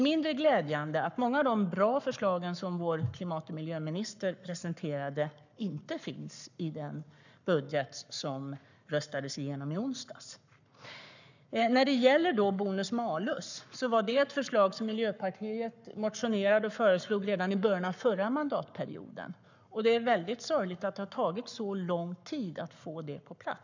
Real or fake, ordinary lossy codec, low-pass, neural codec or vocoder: fake; none; 7.2 kHz; codec, 44.1 kHz, 7.8 kbps, Pupu-Codec